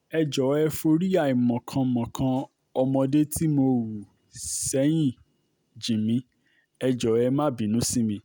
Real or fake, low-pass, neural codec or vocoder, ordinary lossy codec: real; none; none; none